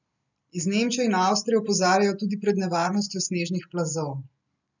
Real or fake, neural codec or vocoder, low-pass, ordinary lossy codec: real; none; 7.2 kHz; none